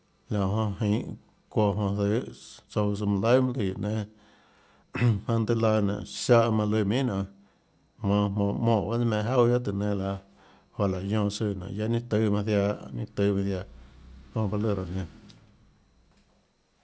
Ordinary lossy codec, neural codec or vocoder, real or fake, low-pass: none; none; real; none